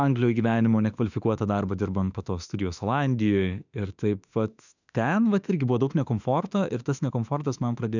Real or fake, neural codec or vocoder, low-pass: fake; autoencoder, 48 kHz, 32 numbers a frame, DAC-VAE, trained on Japanese speech; 7.2 kHz